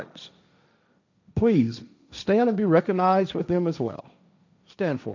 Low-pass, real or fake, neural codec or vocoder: 7.2 kHz; fake; codec, 16 kHz, 1.1 kbps, Voila-Tokenizer